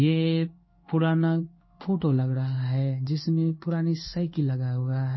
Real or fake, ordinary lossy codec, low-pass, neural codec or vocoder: fake; MP3, 24 kbps; 7.2 kHz; codec, 16 kHz in and 24 kHz out, 1 kbps, XY-Tokenizer